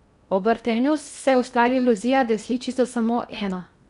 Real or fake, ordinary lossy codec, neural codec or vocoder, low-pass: fake; none; codec, 16 kHz in and 24 kHz out, 0.8 kbps, FocalCodec, streaming, 65536 codes; 10.8 kHz